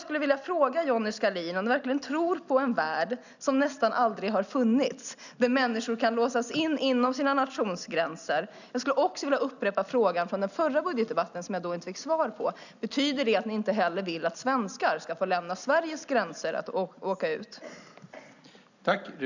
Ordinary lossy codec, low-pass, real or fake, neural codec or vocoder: none; 7.2 kHz; real; none